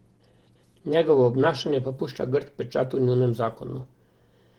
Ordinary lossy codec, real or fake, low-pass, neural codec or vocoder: Opus, 16 kbps; fake; 19.8 kHz; vocoder, 48 kHz, 128 mel bands, Vocos